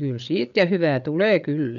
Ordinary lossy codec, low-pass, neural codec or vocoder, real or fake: none; 7.2 kHz; codec, 16 kHz, 8 kbps, FreqCodec, larger model; fake